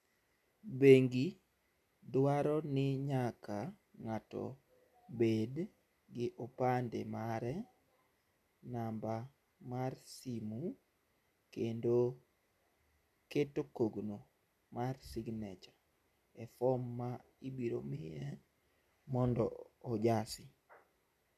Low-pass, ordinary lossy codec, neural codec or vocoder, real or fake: 14.4 kHz; none; none; real